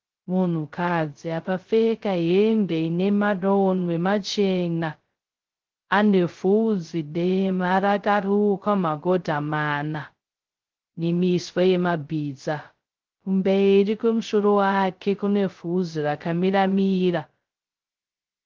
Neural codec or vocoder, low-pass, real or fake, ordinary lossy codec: codec, 16 kHz, 0.2 kbps, FocalCodec; 7.2 kHz; fake; Opus, 16 kbps